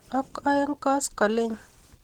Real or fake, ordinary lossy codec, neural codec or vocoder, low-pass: real; Opus, 16 kbps; none; 19.8 kHz